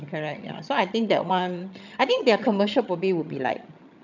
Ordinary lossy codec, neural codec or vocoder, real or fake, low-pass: none; vocoder, 22.05 kHz, 80 mel bands, HiFi-GAN; fake; 7.2 kHz